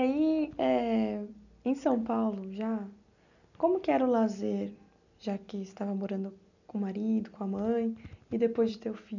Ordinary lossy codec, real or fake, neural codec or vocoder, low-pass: none; real; none; 7.2 kHz